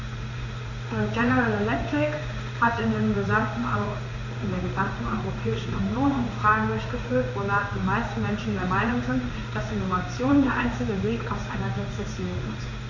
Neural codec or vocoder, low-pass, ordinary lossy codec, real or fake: codec, 16 kHz in and 24 kHz out, 1 kbps, XY-Tokenizer; 7.2 kHz; none; fake